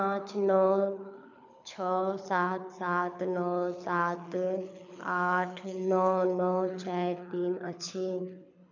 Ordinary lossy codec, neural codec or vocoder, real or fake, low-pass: none; codec, 24 kHz, 6 kbps, HILCodec; fake; 7.2 kHz